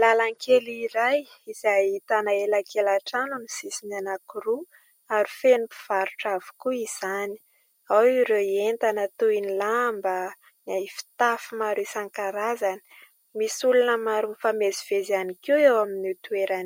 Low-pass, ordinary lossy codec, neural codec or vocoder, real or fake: 19.8 kHz; MP3, 64 kbps; none; real